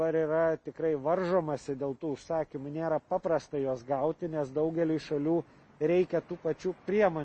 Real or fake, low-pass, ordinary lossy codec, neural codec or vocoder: real; 10.8 kHz; MP3, 32 kbps; none